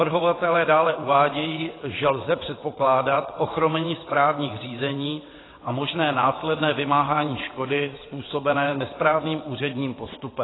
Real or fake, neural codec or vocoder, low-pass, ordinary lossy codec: fake; vocoder, 22.05 kHz, 80 mel bands, WaveNeXt; 7.2 kHz; AAC, 16 kbps